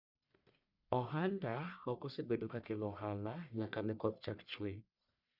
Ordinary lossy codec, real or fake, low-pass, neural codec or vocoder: none; fake; 5.4 kHz; codec, 44.1 kHz, 1.7 kbps, Pupu-Codec